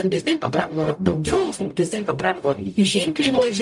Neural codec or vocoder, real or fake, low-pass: codec, 44.1 kHz, 0.9 kbps, DAC; fake; 10.8 kHz